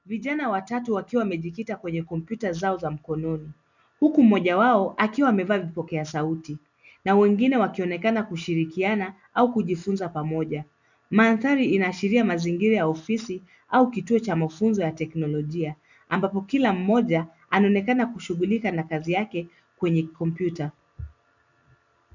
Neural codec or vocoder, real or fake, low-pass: none; real; 7.2 kHz